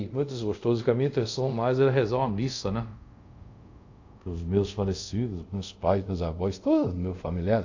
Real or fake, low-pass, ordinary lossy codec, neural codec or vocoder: fake; 7.2 kHz; AAC, 48 kbps; codec, 24 kHz, 0.5 kbps, DualCodec